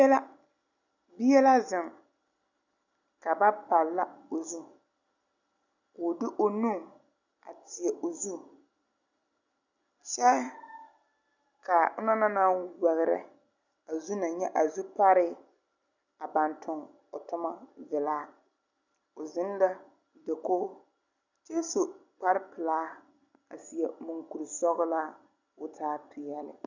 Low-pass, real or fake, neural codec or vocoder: 7.2 kHz; real; none